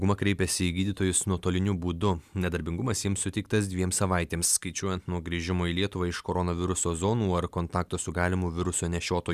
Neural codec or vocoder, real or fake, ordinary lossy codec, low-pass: none; real; Opus, 64 kbps; 14.4 kHz